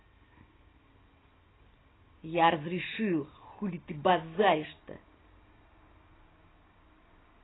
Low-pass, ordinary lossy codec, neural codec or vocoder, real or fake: 7.2 kHz; AAC, 16 kbps; none; real